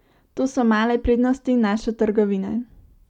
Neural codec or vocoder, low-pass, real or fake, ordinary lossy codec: none; 19.8 kHz; real; none